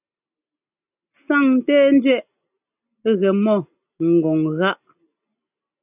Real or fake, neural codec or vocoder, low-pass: real; none; 3.6 kHz